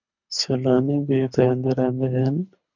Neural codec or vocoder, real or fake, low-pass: codec, 24 kHz, 3 kbps, HILCodec; fake; 7.2 kHz